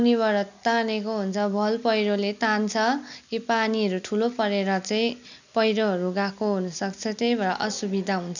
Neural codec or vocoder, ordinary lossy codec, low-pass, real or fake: none; none; 7.2 kHz; real